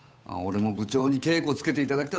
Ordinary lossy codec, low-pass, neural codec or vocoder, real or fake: none; none; codec, 16 kHz, 8 kbps, FunCodec, trained on Chinese and English, 25 frames a second; fake